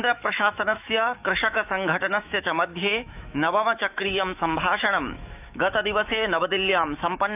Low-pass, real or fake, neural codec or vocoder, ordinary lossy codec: 3.6 kHz; fake; autoencoder, 48 kHz, 128 numbers a frame, DAC-VAE, trained on Japanese speech; none